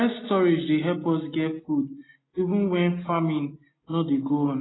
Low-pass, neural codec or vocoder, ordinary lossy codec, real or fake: 7.2 kHz; none; AAC, 16 kbps; real